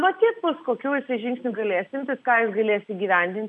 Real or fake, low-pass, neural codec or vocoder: real; 10.8 kHz; none